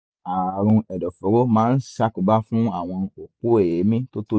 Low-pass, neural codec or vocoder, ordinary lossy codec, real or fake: none; none; none; real